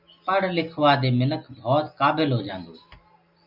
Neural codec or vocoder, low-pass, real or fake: none; 5.4 kHz; real